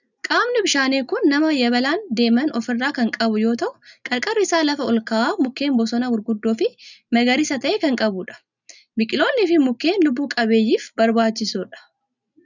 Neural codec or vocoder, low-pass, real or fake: none; 7.2 kHz; real